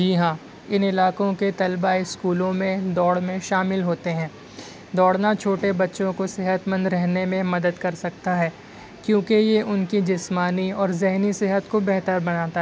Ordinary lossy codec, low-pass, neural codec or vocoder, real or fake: none; none; none; real